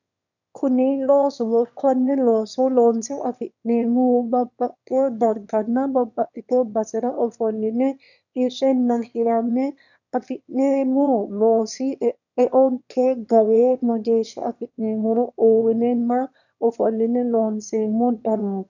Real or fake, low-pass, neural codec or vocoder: fake; 7.2 kHz; autoencoder, 22.05 kHz, a latent of 192 numbers a frame, VITS, trained on one speaker